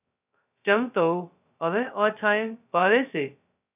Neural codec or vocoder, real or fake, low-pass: codec, 16 kHz, 0.2 kbps, FocalCodec; fake; 3.6 kHz